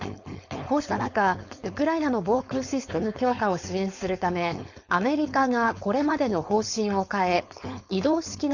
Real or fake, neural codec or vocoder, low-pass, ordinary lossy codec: fake; codec, 16 kHz, 4.8 kbps, FACodec; 7.2 kHz; none